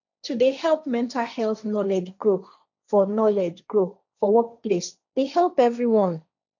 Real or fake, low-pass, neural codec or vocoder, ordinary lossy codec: fake; 7.2 kHz; codec, 16 kHz, 1.1 kbps, Voila-Tokenizer; none